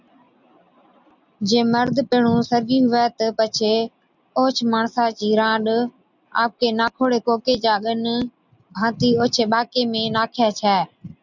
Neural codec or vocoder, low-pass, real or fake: none; 7.2 kHz; real